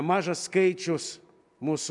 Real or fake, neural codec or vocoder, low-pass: real; none; 10.8 kHz